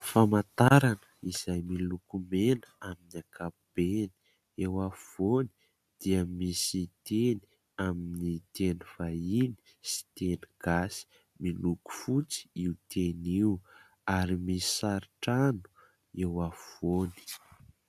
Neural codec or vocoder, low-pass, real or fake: none; 14.4 kHz; real